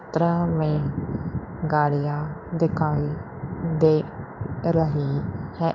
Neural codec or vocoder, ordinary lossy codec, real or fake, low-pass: codec, 16 kHz in and 24 kHz out, 1 kbps, XY-Tokenizer; none; fake; 7.2 kHz